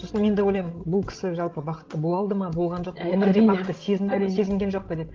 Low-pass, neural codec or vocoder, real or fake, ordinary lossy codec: 7.2 kHz; codec, 16 kHz, 16 kbps, FreqCodec, larger model; fake; Opus, 16 kbps